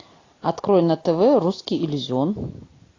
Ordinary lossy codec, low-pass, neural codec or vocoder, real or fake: AAC, 32 kbps; 7.2 kHz; none; real